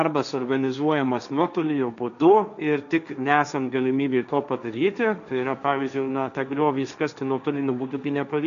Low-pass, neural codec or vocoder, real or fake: 7.2 kHz; codec, 16 kHz, 1.1 kbps, Voila-Tokenizer; fake